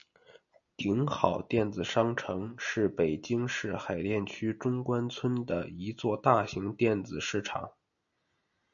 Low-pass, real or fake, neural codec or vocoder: 7.2 kHz; real; none